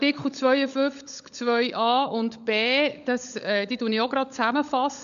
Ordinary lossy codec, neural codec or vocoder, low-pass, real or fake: none; codec, 16 kHz, 16 kbps, FunCodec, trained on LibriTTS, 50 frames a second; 7.2 kHz; fake